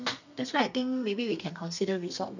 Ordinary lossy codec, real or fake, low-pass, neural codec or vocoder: none; fake; 7.2 kHz; codec, 32 kHz, 1.9 kbps, SNAC